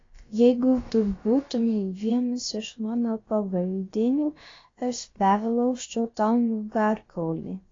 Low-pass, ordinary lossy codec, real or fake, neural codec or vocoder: 7.2 kHz; AAC, 32 kbps; fake; codec, 16 kHz, about 1 kbps, DyCAST, with the encoder's durations